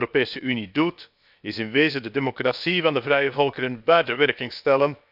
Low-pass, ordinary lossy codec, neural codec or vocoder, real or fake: 5.4 kHz; none; codec, 16 kHz, about 1 kbps, DyCAST, with the encoder's durations; fake